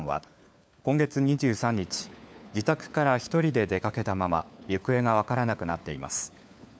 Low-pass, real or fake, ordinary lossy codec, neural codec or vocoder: none; fake; none; codec, 16 kHz, 4 kbps, FunCodec, trained on LibriTTS, 50 frames a second